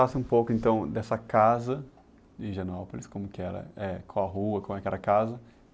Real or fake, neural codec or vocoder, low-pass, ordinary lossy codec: real; none; none; none